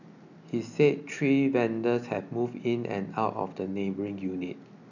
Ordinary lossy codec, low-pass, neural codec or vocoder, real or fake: none; 7.2 kHz; none; real